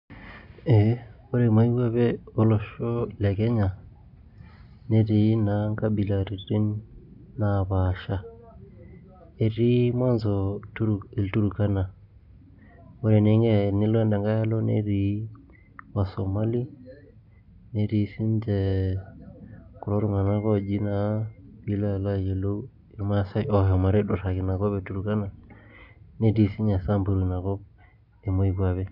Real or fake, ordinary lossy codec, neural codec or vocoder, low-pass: real; none; none; 5.4 kHz